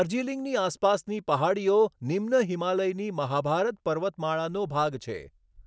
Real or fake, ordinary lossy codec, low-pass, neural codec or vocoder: real; none; none; none